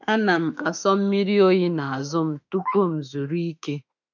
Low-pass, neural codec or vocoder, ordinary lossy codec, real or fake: 7.2 kHz; autoencoder, 48 kHz, 32 numbers a frame, DAC-VAE, trained on Japanese speech; none; fake